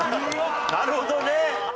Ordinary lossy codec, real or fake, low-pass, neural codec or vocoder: none; real; none; none